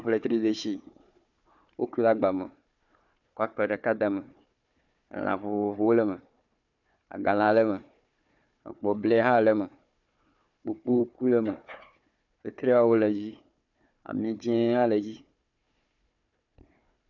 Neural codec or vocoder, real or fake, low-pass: codec, 16 kHz, 4 kbps, FunCodec, trained on Chinese and English, 50 frames a second; fake; 7.2 kHz